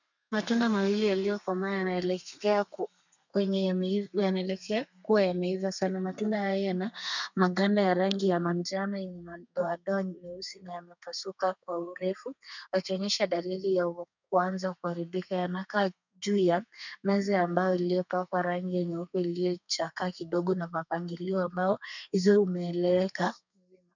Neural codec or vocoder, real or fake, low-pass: codec, 32 kHz, 1.9 kbps, SNAC; fake; 7.2 kHz